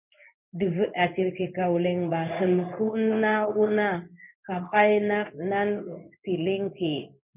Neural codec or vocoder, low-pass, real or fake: codec, 16 kHz in and 24 kHz out, 1 kbps, XY-Tokenizer; 3.6 kHz; fake